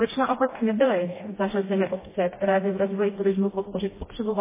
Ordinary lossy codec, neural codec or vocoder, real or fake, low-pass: MP3, 16 kbps; codec, 16 kHz, 1 kbps, FreqCodec, smaller model; fake; 3.6 kHz